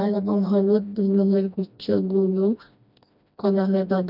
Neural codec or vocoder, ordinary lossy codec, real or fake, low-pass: codec, 16 kHz, 1 kbps, FreqCodec, smaller model; none; fake; 5.4 kHz